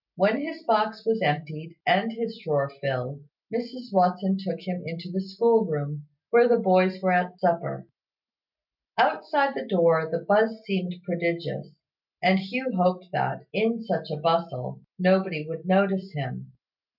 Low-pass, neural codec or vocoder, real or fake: 5.4 kHz; none; real